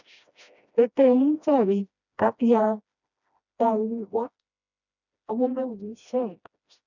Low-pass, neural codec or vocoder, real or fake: 7.2 kHz; codec, 16 kHz, 1 kbps, FreqCodec, smaller model; fake